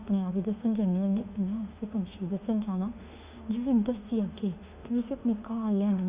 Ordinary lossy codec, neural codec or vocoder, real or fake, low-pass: Opus, 64 kbps; autoencoder, 48 kHz, 32 numbers a frame, DAC-VAE, trained on Japanese speech; fake; 3.6 kHz